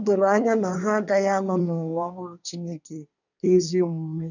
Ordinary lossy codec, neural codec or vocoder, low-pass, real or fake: none; codec, 24 kHz, 1 kbps, SNAC; 7.2 kHz; fake